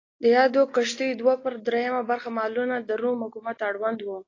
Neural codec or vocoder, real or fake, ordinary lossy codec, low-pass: none; real; AAC, 32 kbps; 7.2 kHz